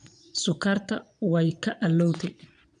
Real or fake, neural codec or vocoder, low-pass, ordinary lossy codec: fake; vocoder, 22.05 kHz, 80 mel bands, Vocos; 9.9 kHz; none